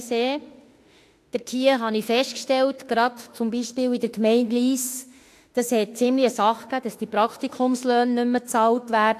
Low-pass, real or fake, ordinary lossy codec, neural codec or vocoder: 14.4 kHz; fake; AAC, 64 kbps; autoencoder, 48 kHz, 32 numbers a frame, DAC-VAE, trained on Japanese speech